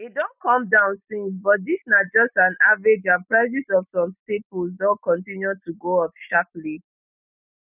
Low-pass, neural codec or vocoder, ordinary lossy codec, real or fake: 3.6 kHz; none; none; real